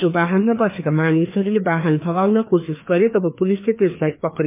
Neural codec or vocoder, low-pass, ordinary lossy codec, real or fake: codec, 16 kHz, 2 kbps, FreqCodec, larger model; 3.6 kHz; MP3, 24 kbps; fake